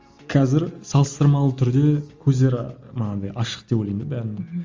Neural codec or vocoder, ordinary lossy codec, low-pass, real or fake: none; Opus, 32 kbps; 7.2 kHz; real